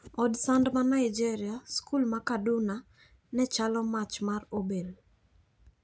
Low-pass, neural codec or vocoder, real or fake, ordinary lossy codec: none; none; real; none